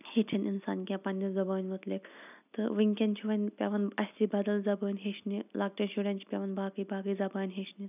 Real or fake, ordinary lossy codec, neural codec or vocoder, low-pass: real; none; none; 3.6 kHz